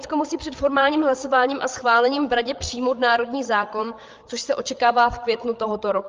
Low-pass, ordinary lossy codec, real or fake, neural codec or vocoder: 7.2 kHz; Opus, 24 kbps; fake; codec, 16 kHz, 8 kbps, FreqCodec, larger model